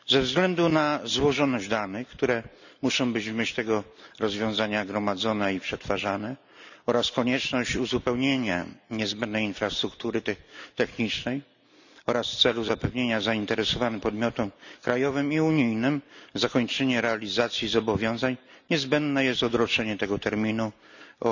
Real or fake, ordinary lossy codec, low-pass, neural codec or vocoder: real; none; 7.2 kHz; none